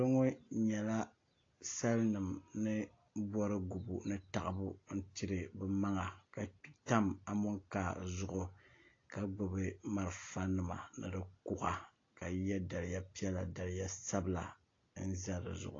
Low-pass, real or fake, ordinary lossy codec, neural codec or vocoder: 7.2 kHz; real; AAC, 32 kbps; none